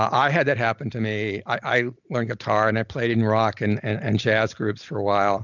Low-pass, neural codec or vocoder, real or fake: 7.2 kHz; none; real